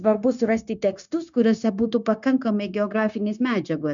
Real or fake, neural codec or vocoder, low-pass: fake; codec, 16 kHz, 0.9 kbps, LongCat-Audio-Codec; 7.2 kHz